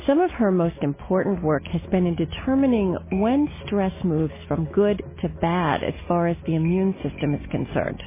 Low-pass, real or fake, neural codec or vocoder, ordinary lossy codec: 3.6 kHz; real; none; MP3, 16 kbps